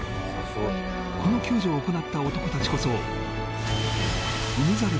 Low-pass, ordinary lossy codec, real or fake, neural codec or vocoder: none; none; real; none